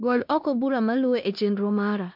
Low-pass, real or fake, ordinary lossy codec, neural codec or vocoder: 5.4 kHz; fake; MP3, 48 kbps; codec, 16 kHz in and 24 kHz out, 0.9 kbps, LongCat-Audio-Codec, four codebook decoder